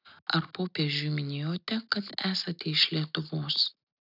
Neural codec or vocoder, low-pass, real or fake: none; 5.4 kHz; real